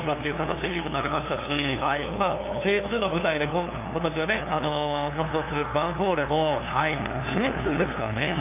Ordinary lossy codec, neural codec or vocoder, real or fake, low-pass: AAC, 32 kbps; codec, 16 kHz, 2 kbps, FunCodec, trained on LibriTTS, 25 frames a second; fake; 3.6 kHz